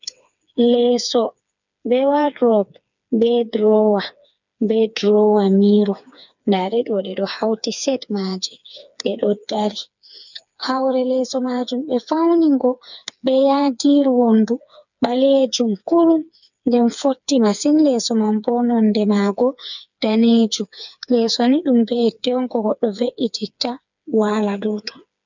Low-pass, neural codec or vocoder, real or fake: 7.2 kHz; codec, 16 kHz, 4 kbps, FreqCodec, smaller model; fake